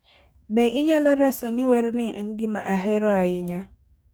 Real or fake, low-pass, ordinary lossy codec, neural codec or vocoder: fake; none; none; codec, 44.1 kHz, 2.6 kbps, DAC